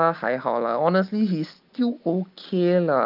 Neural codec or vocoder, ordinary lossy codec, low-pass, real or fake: none; Opus, 32 kbps; 5.4 kHz; real